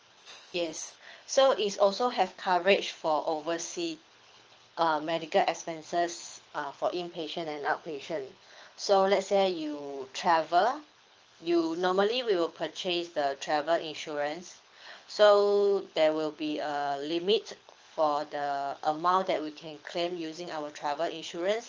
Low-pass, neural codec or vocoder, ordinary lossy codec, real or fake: 7.2 kHz; codec, 24 kHz, 6 kbps, HILCodec; Opus, 24 kbps; fake